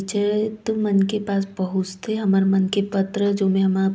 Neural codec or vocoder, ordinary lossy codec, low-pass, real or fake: none; none; none; real